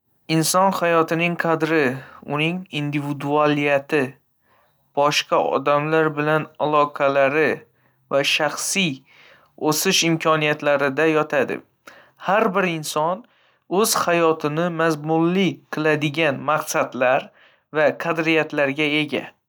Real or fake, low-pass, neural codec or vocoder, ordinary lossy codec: real; none; none; none